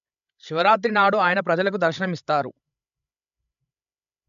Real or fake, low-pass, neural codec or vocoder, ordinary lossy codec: fake; 7.2 kHz; codec, 16 kHz, 16 kbps, FreqCodec, larger model; AAC, 96 kbps